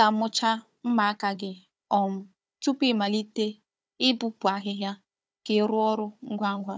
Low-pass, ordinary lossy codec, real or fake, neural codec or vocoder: none; none; fake; codec, 16 kHz, 4 kbps, FunCodec, trained on Chinese and English, 50 frames a second